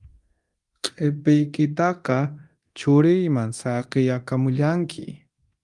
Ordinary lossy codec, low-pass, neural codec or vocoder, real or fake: Opus, 24 kbps; 10.8 kHz; codec, 24 kHz, 0.9 kbps, DualCodec; fake